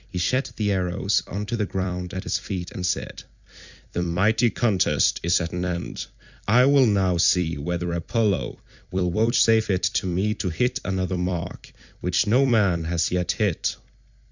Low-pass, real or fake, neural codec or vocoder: 7.2 kHz; fake; vocoder, 44.1 kHz, 128 mel bands every 256 samples, BigVGAN v2